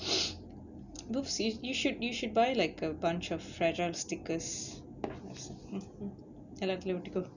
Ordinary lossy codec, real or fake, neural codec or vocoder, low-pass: none; real; none; 7.2 kHz